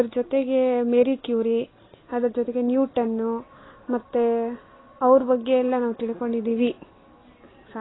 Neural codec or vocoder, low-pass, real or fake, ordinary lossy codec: none; 7.2 kHz; real; AAC, 16 kbps